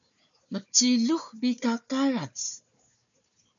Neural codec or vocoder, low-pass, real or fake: codec, 16 kHz, 4 kbps, FunCodec, trained on Chinese and English, 50 frames a second; 7.2 kHz; fake